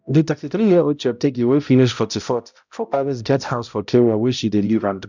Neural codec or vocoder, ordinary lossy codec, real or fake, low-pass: codec, 16 kHz, 0.5 kbps, X-Codec, HuBERT features, trained on balanced general audio; none; fake; 7.2 kHz